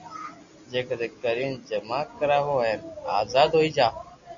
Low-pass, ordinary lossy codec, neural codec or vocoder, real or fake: 7.2 kHz; Opus, 64 kbps; none; real